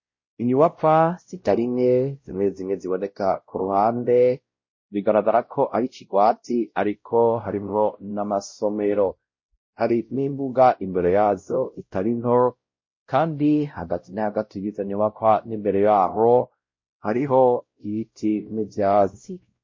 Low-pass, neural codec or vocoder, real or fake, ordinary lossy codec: 7.2 kHz; codec, 16 kHz, 0.5 kbps, X-Codec, WavLM features, trained on Multilingual LibriSpeech; fake; MP3, 32 kbps